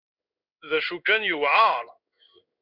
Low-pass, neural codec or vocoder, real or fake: 5.4 kHz; codec, 16 kHz in and 24 kHz out, 1 kbps, XY-Tokenizer; fake